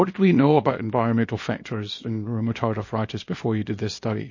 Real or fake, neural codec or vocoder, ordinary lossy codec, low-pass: fake; codec, 24 kHz, 0.9 kbps, WavTokenizer, small release; MP3, 32 kbps; 7.2 kHz